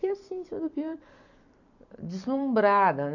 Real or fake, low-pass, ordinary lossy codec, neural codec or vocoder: fake; 7.2 kHz; none; vocoder, 44.1 kHz, 128 mel bands every 256 samples, BigVGAN v2